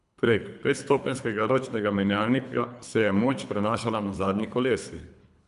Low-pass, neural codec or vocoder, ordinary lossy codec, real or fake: 10.8 kHz; codec, 24 kHz, 3 kbps, HILCodec; AAC, 96 kbps; fake